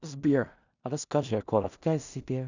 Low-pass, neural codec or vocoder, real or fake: 7.2 kHz; codec, 16 kHz in and 24 kHz out, 0.4 kbps, LongCat-Audio-Codec, two codebook decoder; fake